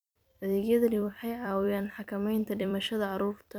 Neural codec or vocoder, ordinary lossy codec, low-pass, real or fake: vocoder, 44.1 kHz, 128 mel bands every 256 samples, BigVGAN v2; none; none; fake